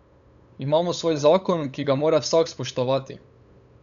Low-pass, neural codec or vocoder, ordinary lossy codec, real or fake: 7.2 kHz; codec, 16 kHz, 8 kbps, FunCodec, trained on LibriTTS, 25 frames a second; none; fake